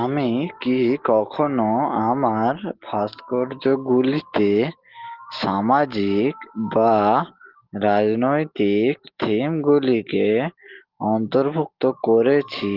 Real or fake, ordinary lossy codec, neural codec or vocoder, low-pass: real; Opus, 16 kbps; none; 5.4 kHz